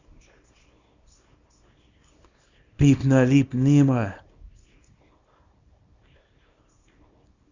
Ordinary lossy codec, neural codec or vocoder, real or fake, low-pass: Opus, 64 kbps; codec, 24 kHz, 0.9 kbps, WavTokenizer, small release; fake; 7.2 kHz